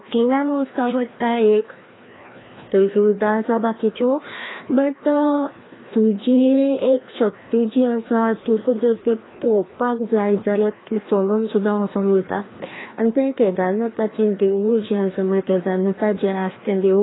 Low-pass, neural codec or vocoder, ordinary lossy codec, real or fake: 7.2 kHz; codec, 16 kHz, 1 kbps, FreqCodec, larger model; AAC, 16 kbps; fake